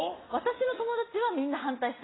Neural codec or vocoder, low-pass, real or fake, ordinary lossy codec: none; 7.2 kHz; real; AAC, 16 kbps